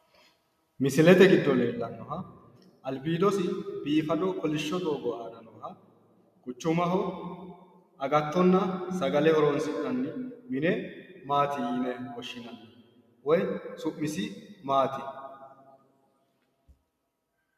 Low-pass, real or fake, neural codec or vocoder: 14.4 kHz; real; none